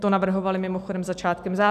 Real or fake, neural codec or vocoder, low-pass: real; none; 14.4 kHz